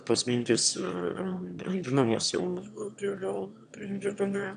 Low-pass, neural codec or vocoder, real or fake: 9.9 kHz; autoencoder, 22.05 kHz, a latent of 192 numbers a frame, VITS, trained on one speaker; fake